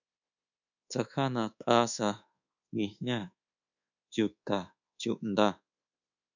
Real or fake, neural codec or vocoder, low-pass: fake; codec, 24 kHz, 1.2 kbps, DualCodec; 7.2 kHz